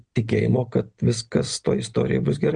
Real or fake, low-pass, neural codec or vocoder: real; 9.9 kHz; none